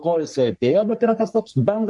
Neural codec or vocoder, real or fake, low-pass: codec, 44.1 kHz, 3.4 kbps, Pupu-Codec; fake; 10.8 kHz